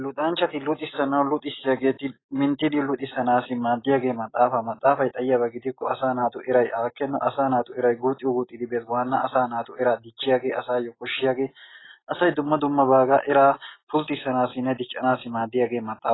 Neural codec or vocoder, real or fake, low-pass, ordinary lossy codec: none; real; 7.2 kHz; AAC, 16 kbps